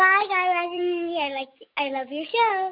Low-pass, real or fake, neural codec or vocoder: 5.4 kHz; fake; codec, 44.1 kHz, 7.8 kbps, DAC